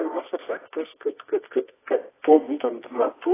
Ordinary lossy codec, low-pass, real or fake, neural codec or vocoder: AAC, 16 kbps; 3.6 kHz; fake; codec, 24 kHz, 0.9 kbps, WavTokenizer, medium music audio release